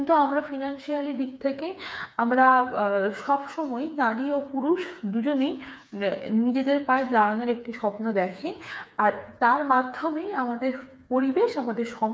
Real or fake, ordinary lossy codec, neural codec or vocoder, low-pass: fake; none; codec, 16 kHz, 4 kbps, FreqCodec, smaller model; none